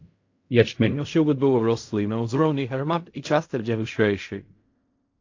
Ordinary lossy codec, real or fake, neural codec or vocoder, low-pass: AAC, 48 kbps; fake; codec, 16 kHz in and 24 kHz out, 0.4 kbps, LongCat-Audio-Codec, fine tuned four codebook decoder; 7.2 kHz